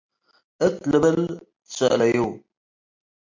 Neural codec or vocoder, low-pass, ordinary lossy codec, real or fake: none; 7.2 kHz; MP3, 48 kbps; real